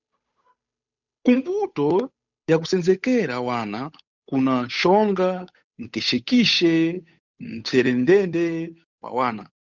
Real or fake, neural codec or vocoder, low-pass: fake; codec, 16 kHz, 8 kbps, FunCodec, trained on Chinese and English, 25 frames a second; 7.2 kHz